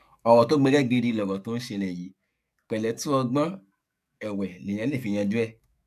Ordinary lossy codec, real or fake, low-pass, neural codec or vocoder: none; fake; 14.4 kHz; codec, 44.1 kHz, 7.8 kbps, DAC